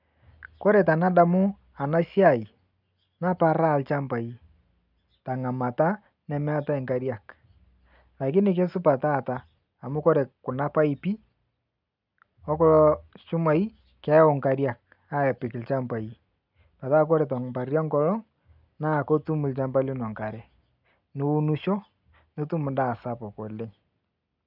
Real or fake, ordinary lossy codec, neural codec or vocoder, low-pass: real; none; none; 5.4 kHz